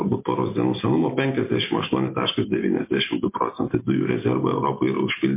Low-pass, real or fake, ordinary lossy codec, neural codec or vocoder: 3.6 kHz; real; MP3, 24 kbps; none